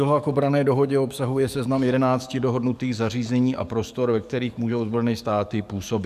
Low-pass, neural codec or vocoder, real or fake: 14.4 kHz; codec, 44.1 kHz, 7.8 kbps, DAC; fake